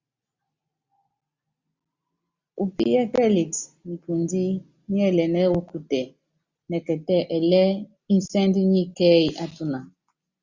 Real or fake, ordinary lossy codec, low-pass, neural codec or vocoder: real; Opus, 64 kbps; 7.2 kHz; none